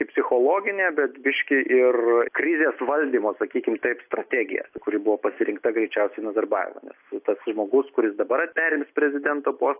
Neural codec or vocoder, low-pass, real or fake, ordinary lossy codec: none; 3.6 kHz; real; AAC, 32 kbps